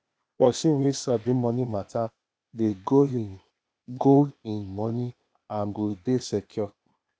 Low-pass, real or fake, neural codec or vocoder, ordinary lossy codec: none; fake; codec, 16 kHz, 0.8 kbps, ZipCodec; none